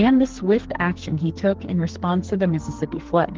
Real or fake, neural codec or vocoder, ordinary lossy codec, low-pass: fake; codec, 44.1 kHz, 2.6 kbps, SNAC; Opus, 16 kbps; 7.2 kHz